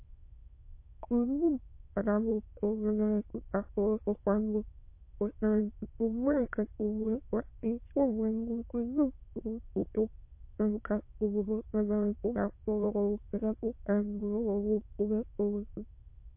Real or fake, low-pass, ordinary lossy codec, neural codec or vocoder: fake; 3.6 kHz; MP3, 24 kbps; autoencoder, 22.05 kHz, a latent of 192 numbers a frame, VITS, trained on many speakers